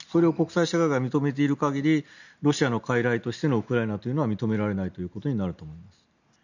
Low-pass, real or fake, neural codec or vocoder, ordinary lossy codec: 7.2 kHz; real; none; none